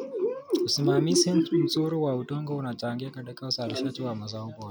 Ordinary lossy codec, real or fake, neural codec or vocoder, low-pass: none; real; none; none